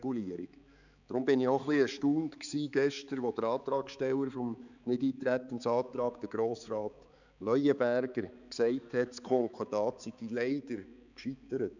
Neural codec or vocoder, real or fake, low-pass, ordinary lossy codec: codec, 16 kHz, 4 kbps, X-Codec, HuBERT features, trained on balanced general audio; fake; 7.2 kHz; none